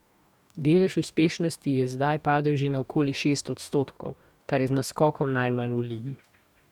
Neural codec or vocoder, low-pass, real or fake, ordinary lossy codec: codec, 44.1 kHz, 2.6 kbps, DAC; 19.8 kHz; fake; none